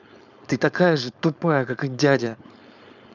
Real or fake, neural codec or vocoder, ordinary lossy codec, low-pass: fake; codec, 16 kHz, 4.8 kbps, FACodec; none; 7.2 kHz